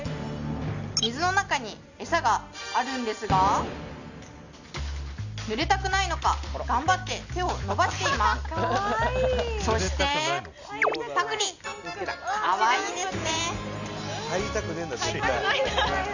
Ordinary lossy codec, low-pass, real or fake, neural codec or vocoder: none; 7.2 kHz; real; none